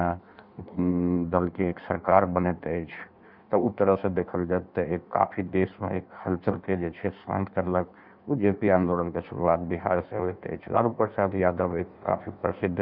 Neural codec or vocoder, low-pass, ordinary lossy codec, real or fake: codec, 16 kHz in and 24 kHz out, 1.1 kbps, FireRedTTS-2 codec; 5.4 kHz; none; fake